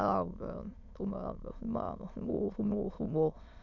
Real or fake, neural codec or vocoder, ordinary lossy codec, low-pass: fake; autoencoder, 22.05 kHz, a latent of 192 numbers a frame, VITS, trained on many speakers; none; 7.2 kHz